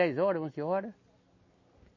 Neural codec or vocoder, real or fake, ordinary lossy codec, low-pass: none; real; MP3, 32 kbps; 7.2 kHz